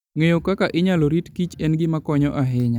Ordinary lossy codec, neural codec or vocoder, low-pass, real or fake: none; none; 19.8 kHz; real